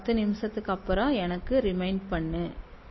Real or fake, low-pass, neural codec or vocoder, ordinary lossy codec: real; 7.2 kHz; none; MP3, 24 kbps